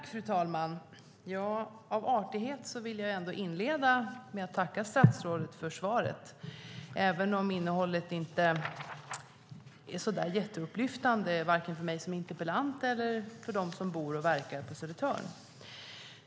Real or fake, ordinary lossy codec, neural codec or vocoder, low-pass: real; none; none; none